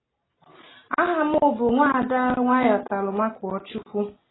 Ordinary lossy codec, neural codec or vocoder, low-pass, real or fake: AAC, 16 kbps; none; 7.2 kHz; real